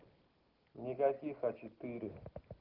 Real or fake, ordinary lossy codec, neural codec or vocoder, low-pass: real; Opus, 16 kbps; none; 5.4 kHz